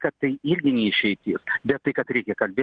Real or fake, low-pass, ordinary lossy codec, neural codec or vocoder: real; 9.9 kHz; Opus, 16 kbps; none